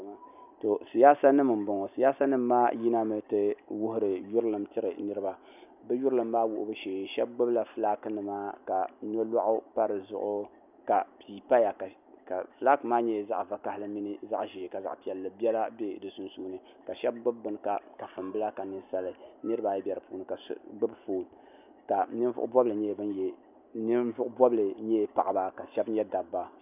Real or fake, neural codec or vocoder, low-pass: real; none; 3.6 kHz